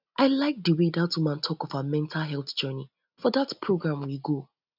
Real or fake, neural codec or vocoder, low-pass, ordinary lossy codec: real; none; 5.4 kHz; none